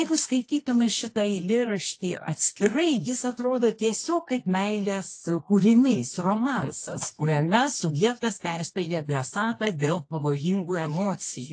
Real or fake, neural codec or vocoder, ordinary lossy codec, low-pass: fake; codec, 24 kHz, 0.9 kbps, WavTokenizer, medium music audio release; AAC, 48 kbps; 9.9 kHz